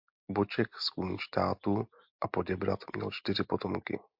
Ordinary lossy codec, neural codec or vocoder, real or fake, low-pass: MP3, 48 kbps; none; real; 5.4 kHz